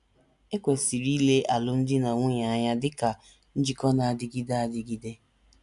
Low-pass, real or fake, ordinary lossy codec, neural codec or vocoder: 10.8 kHz; real; none; none